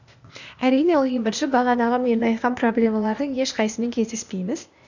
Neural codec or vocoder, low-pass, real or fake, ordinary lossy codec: codec, 16 kHz, 0.8 kbps, ZipCodec; 7.2 kHz; fake; none